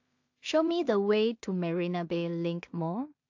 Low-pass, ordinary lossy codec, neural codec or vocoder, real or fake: 7.2 kHz; AAC, 48 kbps; codec, 16 kHz in and 24 kHz out, 0.4 kbps, LongCat-Audio-Codec, two codebook decoder; fake